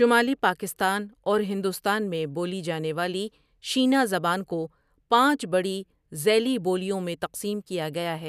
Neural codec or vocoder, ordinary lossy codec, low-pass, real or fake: none; none; 14.4 kHz; real